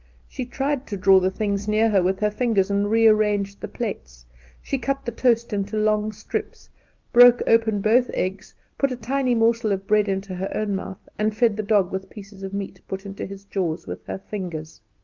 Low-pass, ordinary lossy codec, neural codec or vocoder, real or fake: 7.2 kHz; Opus, 24 kbps; none; real